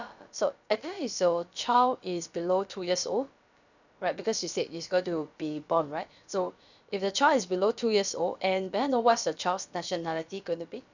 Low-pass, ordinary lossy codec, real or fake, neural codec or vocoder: 7.2 kHz; none; fake; codec, 16 kHz, about 1 kbps, DyCAST, with the encoder's durations